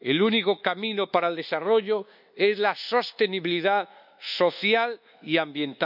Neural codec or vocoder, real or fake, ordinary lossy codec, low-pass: codec, 24 kHz, 1.2 kbps, DualCodec; fake; none; 5.4 kHz